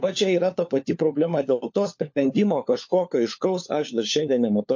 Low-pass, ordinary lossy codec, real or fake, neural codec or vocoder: 7.2 kHz; MP3, 48 kbps; fake; codec, 16 kHz, 4 kbps, FunCodec, trained on LibriTTS, 50 frames a second